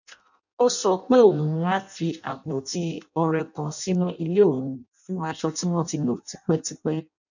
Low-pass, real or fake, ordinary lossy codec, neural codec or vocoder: 7.2 kHz; fake; none; codec, 16 kHz in and 24 kHz out, 0.6 kbps, FireRedTTS-2 codec